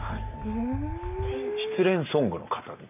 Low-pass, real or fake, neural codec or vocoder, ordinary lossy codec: 3.6 kHz; real; none; none